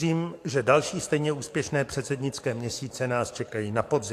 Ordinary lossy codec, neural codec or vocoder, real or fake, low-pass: AAC, 64 kbps; codec, 44.1 kHz, 7.8 kbps, DAC; fake; 14.4 kHz